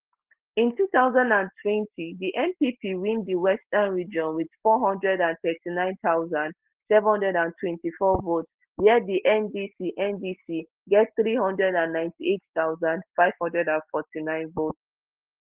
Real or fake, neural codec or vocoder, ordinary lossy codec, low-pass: real; none; Opus, 16 kbps; 3.6 kHz